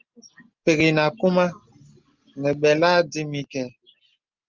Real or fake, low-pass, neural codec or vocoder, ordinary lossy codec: real; 7.2 kHz; none; Opus, 32 kbps